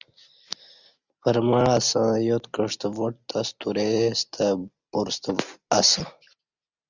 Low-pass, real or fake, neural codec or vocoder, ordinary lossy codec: 7.2 kHz; real; none; Opus, 64 kbps